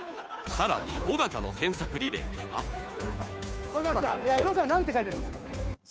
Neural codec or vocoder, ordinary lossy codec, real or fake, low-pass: codec, 16 kHz, 2 kbps, FunCodec, trained on Chinese and English, 25 frames a second; none; fake; none